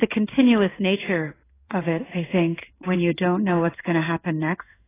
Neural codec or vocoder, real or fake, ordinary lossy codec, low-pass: codec, 16 kHz in and 24 kHz out, 1 kbps, XY-Tokenizer; fake; AAC, 16 kbps; 3.6 kHz